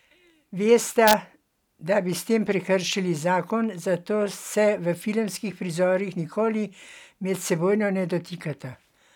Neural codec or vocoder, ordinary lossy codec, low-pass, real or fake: none; none; 19.8 kHz; real